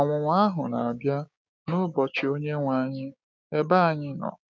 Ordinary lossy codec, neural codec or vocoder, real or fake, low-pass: none; codec, 16 kHz, 6 kbps, DAC; fake; none